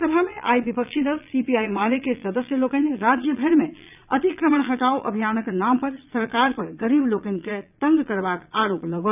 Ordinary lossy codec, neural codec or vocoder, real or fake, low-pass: none; vocoder, 22.05 kHz, 80 mel bands, Vocos; fake; 3.6 kHz